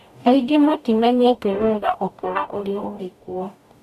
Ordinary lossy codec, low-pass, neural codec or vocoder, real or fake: none; 14.4 kHz; codec, 44.1 kHz, 0.9 kbps, DAC; fake